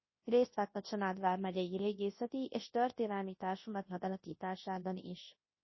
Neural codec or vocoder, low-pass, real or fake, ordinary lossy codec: codec, 24 kHz, 0.9 kbps, WavTokenizer, large speech release; 7.2 kHz; fake; MP3, 24 kbps